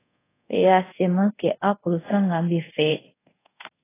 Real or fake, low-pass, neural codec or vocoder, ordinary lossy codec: fake; 3.6 kHz; codec, 24 kHz, 0.5 kbps, DualCodec; AAC, 16 kbps